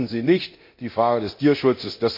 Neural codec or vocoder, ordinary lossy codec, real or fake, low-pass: codec, 24 kHz, 0.9 kbps, DualCodec; MP3, 32 kbps; fake; 5.4 kHz